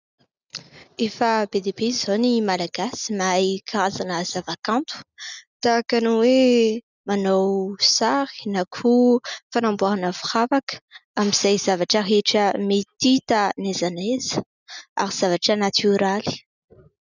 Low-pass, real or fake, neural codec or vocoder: 7.2 kHz; real; none